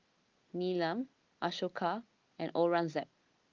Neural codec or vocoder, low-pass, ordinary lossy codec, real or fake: none; 7.2 kHz; Opus, 32 kbps; real